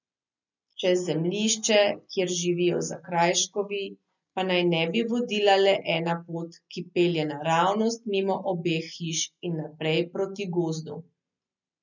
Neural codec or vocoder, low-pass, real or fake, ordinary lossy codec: none; 7.2 kHz; real; none